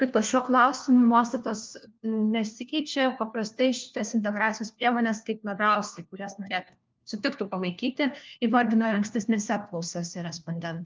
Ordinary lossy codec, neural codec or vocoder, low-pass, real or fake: Opus, 24 kbps; codec, 16 kHz, 1 kbps, FunCodec, trained on LibriTTS, 50 frames a second; 7.2 kHz; fake